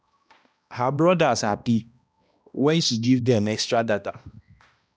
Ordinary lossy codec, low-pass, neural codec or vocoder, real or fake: none; none; codec, 16 kHz, 1 kbps, X-Codec, HuBERT features, trained on balanced general audio; fake